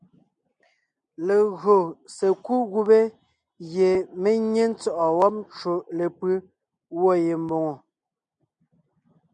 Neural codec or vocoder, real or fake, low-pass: none; real; 9.9 kHz